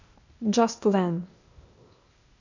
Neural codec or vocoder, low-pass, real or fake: codec, 16 kHz, 0.8 kbps, ZipCodec; 7.2 kHz; fake